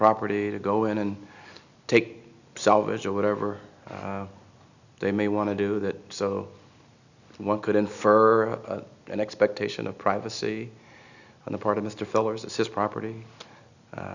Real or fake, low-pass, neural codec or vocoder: real; 7.2 kHz; none